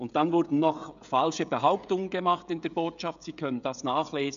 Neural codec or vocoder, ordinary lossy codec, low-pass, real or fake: codec, 16 kHz, 16 kbps, FreqCodec, smaller model; none; 7.2 kHz; fake